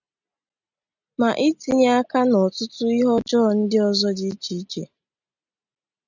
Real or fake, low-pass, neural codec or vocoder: real; 7.2 kHz; none